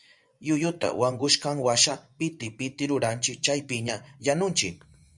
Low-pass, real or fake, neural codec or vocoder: 10.8 kHz; fake; vocoder, 24 kHz, 100 mel bands, Vocos